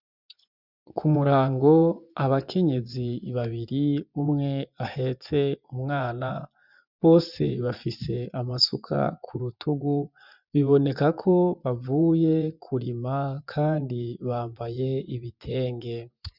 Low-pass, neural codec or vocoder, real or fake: 5.4 kHz; vocoder, 24 kHz, 100 mel bands, Vocos; fake